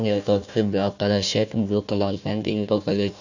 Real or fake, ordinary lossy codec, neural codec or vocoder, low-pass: fake; none; codec, 16 kHz, 1 kbps, FunCodec, trained on Chinese and English, 50 frames a second; 7.2 kHz